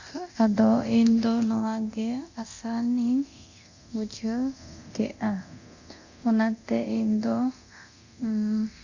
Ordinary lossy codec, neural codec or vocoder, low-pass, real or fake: Opus, 64 kbps; codec, 24 kHz, 0.9 kbps, DualCodec; 7.2 kHz; fake